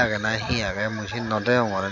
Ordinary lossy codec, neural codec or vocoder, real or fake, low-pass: none; none; real; 7.2 kHz